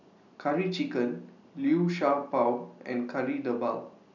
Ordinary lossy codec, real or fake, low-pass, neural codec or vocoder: none; real; 7.2 kHz; none